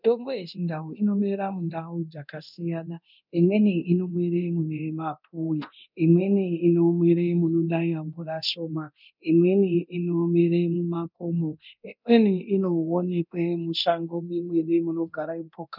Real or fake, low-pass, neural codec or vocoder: fake; 5.4 kHz; codec, 24 kHz, 0.9 kbps, DualCodec